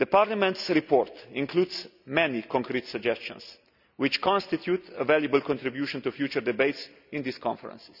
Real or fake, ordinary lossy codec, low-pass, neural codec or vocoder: real; none; 5.4 kHz; none